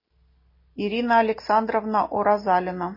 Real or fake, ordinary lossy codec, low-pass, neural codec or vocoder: real; MP3, 24 kbps; 5.4 kHz; none